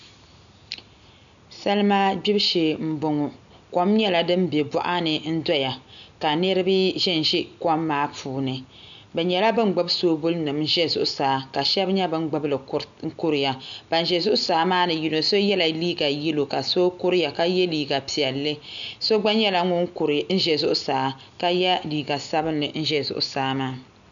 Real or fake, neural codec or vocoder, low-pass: real; none; 7.2 kHz